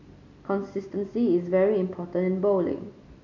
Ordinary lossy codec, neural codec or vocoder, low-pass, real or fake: none; none; 7.2 kHz; real